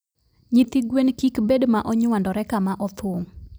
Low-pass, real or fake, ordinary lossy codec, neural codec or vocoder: none; fake; none; vocoder, 44.1 kHz, 128 mel bands every 256 samples, BigVGAN v2